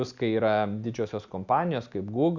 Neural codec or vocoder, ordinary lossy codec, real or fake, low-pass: none; Opus, 64 kbps; real; 7.2 kHz